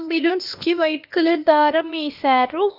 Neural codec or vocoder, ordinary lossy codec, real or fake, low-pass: codec, 16 kHz, 0.8 kbps, ZipCodec; AAC, 48 kbps; fake; 5.4 kHz